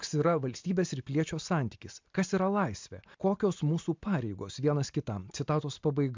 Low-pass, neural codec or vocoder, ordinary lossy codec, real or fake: 7.2 kHz; none; MP3, 64 kbps; real